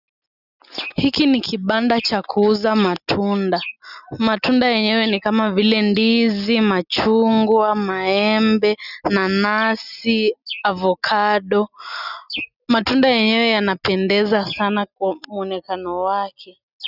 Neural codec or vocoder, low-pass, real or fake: none; 5.4 kHz; real